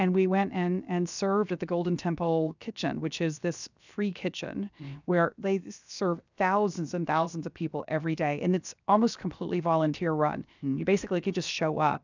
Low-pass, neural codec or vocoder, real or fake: 7.2 kHz; codec, 16 kHz, 0.7 kbps, FocalCodec; fake